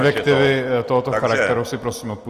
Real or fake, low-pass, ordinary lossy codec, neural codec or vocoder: real; 14.4 kHz; Opus, 24 kbps; none